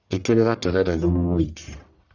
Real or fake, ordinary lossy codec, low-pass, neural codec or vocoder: fake; none; 7.2 kHz; codec, 44.1 kHz, 1.7 kbps, Pupu-Codec